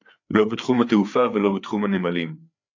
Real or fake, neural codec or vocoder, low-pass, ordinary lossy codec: fake; codec, 16 kHz, 4 kbps, FreqCodec, larger model; 7.2 kHz; AAC, 48 kbps